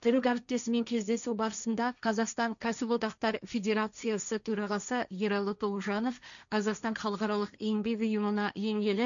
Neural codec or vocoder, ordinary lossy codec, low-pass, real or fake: codec, 16 kHz, 1.1 kbps, Voila-Tokenizer; none; 7.2 kHz; fake